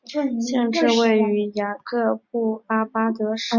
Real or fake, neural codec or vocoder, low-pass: real; none; 7.2 kHz